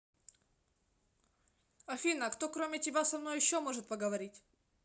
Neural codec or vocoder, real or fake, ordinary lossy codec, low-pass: none; real; none; none